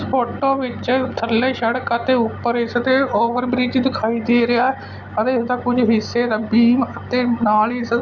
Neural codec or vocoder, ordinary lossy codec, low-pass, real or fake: none; Opus, 64 kbps; 7.2 kHz; real